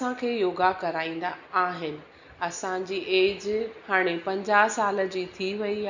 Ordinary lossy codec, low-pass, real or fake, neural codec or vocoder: none; 7.2 kHz; real; none